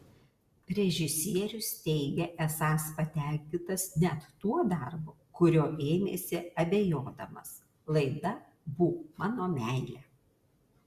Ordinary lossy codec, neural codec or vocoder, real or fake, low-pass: Opus, 64 kbps; none; real; 14.4 kHz